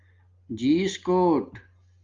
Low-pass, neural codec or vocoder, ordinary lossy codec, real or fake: 7.2 kHz; none; Opus, 32 kbps; real